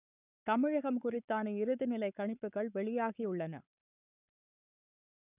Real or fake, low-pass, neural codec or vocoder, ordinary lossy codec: fake; 3.6 kHz; codec, 44.1 kHz, 7.8 kbps, Pupu-Codec; none